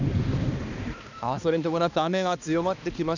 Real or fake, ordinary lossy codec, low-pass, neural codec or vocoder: fake; none; 7.2 kHz; codec, 16 kHz, 2 kbps, X-Codec, HuBERT features, trained on balanced general audio